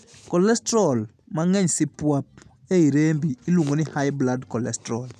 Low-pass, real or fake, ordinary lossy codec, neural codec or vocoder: 14.4 kHz; real; none; none